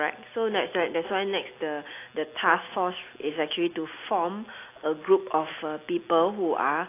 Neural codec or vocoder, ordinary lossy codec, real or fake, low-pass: none; AAC, 24 kbps; real; 3.6 kHz